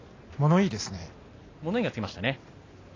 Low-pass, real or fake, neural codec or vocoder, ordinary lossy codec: 7.2 kHz; real; none; AAC, 32 kbps